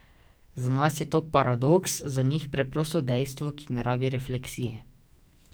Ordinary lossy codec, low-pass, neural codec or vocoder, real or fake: none; none; codec, 44.1 kHz, 2.6 kbps, SNAC; fake